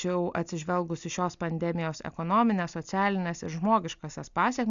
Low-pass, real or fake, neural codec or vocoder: 7.2 kHz; real; none